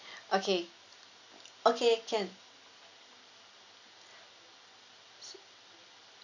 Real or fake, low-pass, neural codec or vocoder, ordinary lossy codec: real; 7.2 kHz; none; none